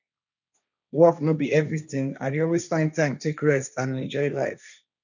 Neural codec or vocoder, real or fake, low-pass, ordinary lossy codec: codec, 16 kHz, 1.1 kbps, Voila-Tokenizer; fake; 7.2 kHz; none